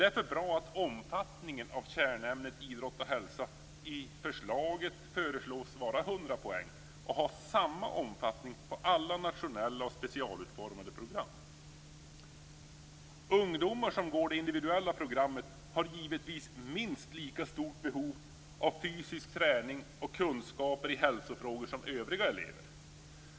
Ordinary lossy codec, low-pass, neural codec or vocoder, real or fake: none; none; none; real